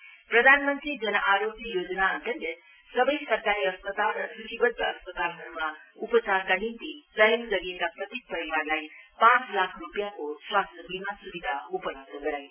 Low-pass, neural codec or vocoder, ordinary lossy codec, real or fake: 3.6 kHz; none; none; real